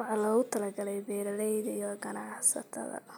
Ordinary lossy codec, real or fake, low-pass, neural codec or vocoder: none; real; none; none